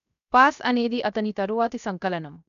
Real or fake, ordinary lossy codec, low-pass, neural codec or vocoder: fake; none; 7.2 kHz; codec, 16 kHz, 0.7 kbps, FocalCodec